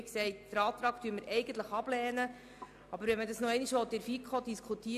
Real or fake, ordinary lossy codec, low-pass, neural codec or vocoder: real; AAC, 96 kbps; 14.4 kHz; none